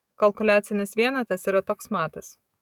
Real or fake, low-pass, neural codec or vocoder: fake; 19.8 kHz; codec, 44.1 kHz, 7.8 kbps, DAC